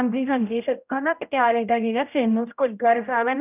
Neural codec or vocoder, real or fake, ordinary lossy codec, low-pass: codec, 16 kHz, 0.5 kbps, X-Codec, HuBERT features, trained on general audio; fake; none; 3.6 kHz